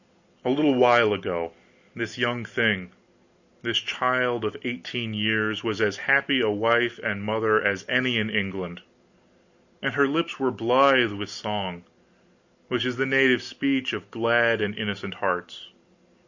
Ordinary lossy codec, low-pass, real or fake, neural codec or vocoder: Opus, 64 kbps; 7.2 kHz; real; none